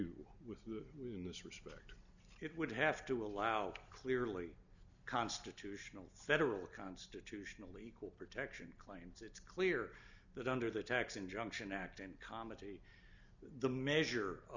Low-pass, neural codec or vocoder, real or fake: 7.2 kHz; none; real